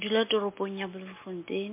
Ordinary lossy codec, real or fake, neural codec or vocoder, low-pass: MP3, 24 kbps; real; none; 3.6 kHz